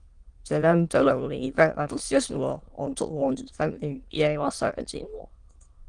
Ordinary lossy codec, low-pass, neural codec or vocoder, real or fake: Opus, 24 kbps; 9.9 kHz; autoencoder, 22.05 kHz, a latent of 192 numbers a frame, VITS, trained on many speakers; fake